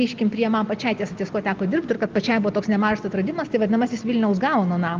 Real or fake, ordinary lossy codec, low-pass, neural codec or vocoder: real; Opus, 32 kbps; 7.2 kHz; none